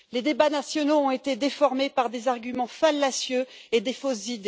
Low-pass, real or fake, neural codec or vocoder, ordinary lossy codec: none; real; none; none